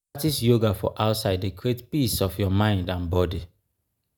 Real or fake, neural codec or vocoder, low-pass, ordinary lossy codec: real; none; none; none